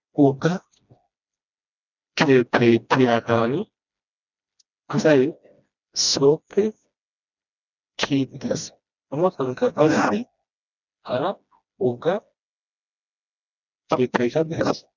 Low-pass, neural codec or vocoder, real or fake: 7.2 kHz; codec, 16 kHz, 1 kbps, FreqCodec, smaller model; fake